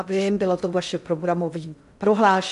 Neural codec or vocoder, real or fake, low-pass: codec, 16 kHz in and 24 kHz out, 0.6 kbps, FocalCodec, streaming, 2048 codes; fake; 10.8 kHz